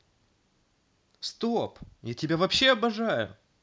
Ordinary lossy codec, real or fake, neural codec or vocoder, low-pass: none; real; none; none